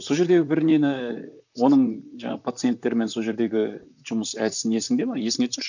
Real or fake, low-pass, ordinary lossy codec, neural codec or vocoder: fake; 7.2 kHz; none; vocoder, 44.1 kHz, 128 mel bands, Pupu-Vocoder